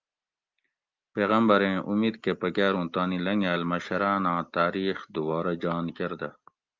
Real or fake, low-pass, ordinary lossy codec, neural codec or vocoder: real; 7.2 kHz; Opus, 24 kbps; none